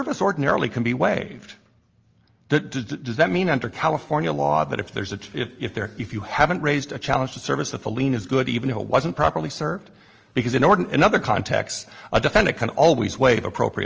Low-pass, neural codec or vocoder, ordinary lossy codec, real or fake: 7.2 kHz; none; Opus, 24 kbps; real